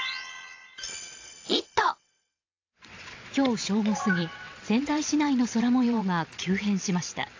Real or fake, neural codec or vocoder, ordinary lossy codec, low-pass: fake; vocoder, 22.05 kHz, 80 mel bands, WaveNeXt; AAC, 48 kbps; 7.2 kHz